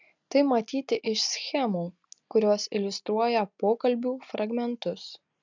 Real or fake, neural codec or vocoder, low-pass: real; none; 7.2 kHz